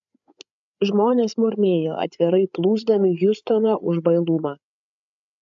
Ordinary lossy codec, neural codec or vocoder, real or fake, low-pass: AAC, 64 kbps; codec, 16 kHz, 8 kbps, FreqCodec, larger model; fake; 7.2 kHz